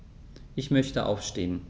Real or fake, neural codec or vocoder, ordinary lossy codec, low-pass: real; none; none; none